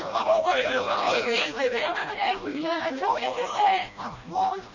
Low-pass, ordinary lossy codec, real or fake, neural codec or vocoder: 7.2 kHz; none; fake; codec, 16 kHz, 1 kbps, FreqCodec, smaller model